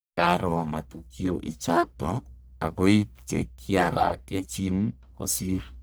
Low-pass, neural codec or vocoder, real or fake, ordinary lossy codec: none; codec, 44.1 kHz, 1.7 kbps, Pupu-Codec; fake; none